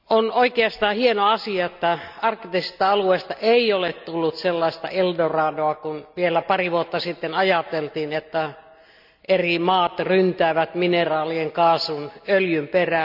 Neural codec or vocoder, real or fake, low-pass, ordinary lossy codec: none; real; 5.4 kHz; none